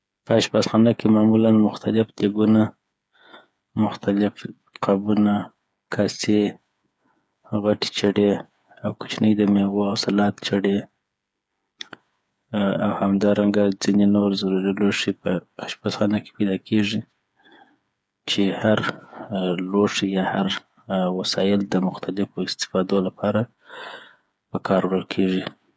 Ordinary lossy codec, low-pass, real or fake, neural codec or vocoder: none; none; fake; codec, 16 kHz, 8 kbps, FreqCodec, smaller model